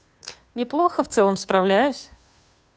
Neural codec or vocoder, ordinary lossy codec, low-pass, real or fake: codec, 16 kHz, 2 kbps, FunCodec, trained on Chinese and English, 25 frames a second; none; none; fake